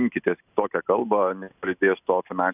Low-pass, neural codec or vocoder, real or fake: 3.6 kHz; none; real